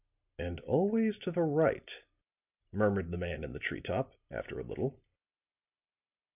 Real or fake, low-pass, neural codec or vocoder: real; 3.6 kHz; none